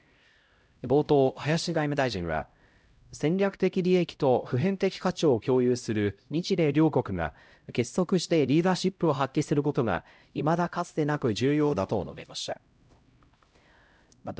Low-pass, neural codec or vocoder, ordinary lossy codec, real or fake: none; codec, 16 kHz, 0.5 kbps, X-Codec, HuBERT features, trained on LibriSpeech; none; fake